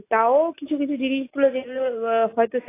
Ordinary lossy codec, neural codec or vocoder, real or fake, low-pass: AAC, 24 kbps; none; real; 3.6 kHz